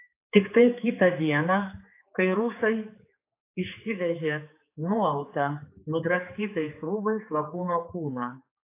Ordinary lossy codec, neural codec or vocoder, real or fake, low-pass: AAC, 24 kbps; codec, 16 kHz, 4 kbps, X-Codec, HuBERT features, trained on general audio; fake; 3.6 kHz